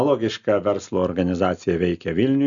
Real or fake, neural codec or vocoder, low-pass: real; none; 7.2 kHz